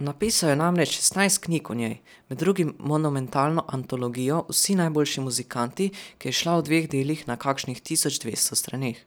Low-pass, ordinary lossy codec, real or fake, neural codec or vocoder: none; none; real; none